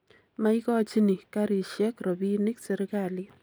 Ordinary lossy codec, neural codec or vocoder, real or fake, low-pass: none; none; real; none